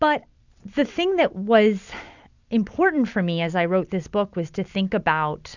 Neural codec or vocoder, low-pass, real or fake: none; 7.2 kHz; real